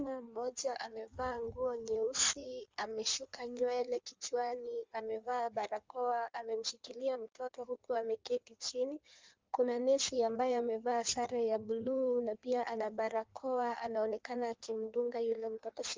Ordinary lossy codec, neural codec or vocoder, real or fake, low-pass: Opus, 32 kbps; codec, 16 kHz in and 24 kHz out, 1.1 kbps, FireRedTTS-2 codec; fake; 7.2 kHz